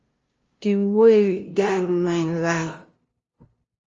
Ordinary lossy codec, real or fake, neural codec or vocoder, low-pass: Opus, 32 kbps; fake; codec, 16 kHz, 0.5 kbps, FunCodec, trained on LibriTTS, 25 frames a second; 7.2 kHz